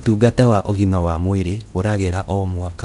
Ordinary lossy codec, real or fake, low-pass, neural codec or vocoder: none; fake; 10.8 kHz; codec, 16 kHz in and 24 kHz out, 0.8 kbps, FocalCodec, streaming, 65536 codes